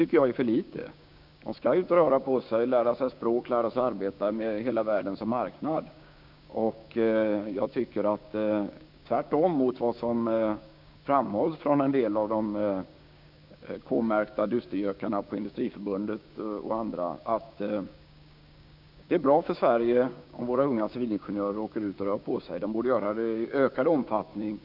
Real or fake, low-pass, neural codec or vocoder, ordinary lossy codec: fake; 5.4 kHz; codec, 16 kHz, 6 kbps, DAC; none